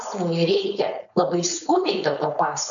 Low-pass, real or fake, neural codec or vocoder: 7.2 kHz; fake; codec, 16 kHz, 4.8 kbps, FACodec